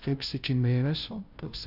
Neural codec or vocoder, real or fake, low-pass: codec, 16 kHz, 0.5 kbps, FunCodec, trained on Chinese and English, 25 frames a second; fake; 5.4 kHz